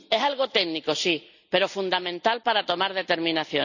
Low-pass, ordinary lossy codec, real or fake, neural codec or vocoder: 7.2 kHz; none; real; none